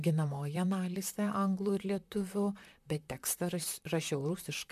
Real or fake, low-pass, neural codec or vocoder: fake; 14.4 kHz; vocoder, 44.1 kHz, 128 mel bands, Pupu-Vocoder